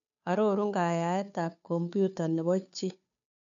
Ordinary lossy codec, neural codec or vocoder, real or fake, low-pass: none; codec, 16 kHz, 2 kbps, FunCodec, trained on Chinese and English, 25 frames a second; fake; 7.2 kHz